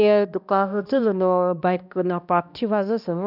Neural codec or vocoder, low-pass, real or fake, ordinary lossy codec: codec, 16 kHz, 1 kbps, X-Codec, HuBERT features, trained on balanced general audio; 5.4 kHz; fake; none